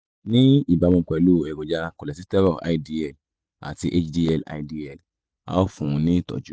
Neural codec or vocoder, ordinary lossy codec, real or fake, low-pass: none; none; real; none